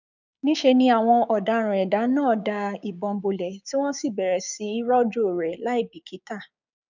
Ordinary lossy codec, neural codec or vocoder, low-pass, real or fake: none; codec, 16 kHz, 6 kbps, DAC; 7.2 kHz; fake